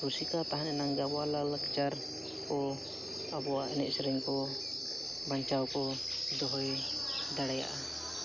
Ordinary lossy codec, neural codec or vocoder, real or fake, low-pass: none; none; real; 7.2 kHz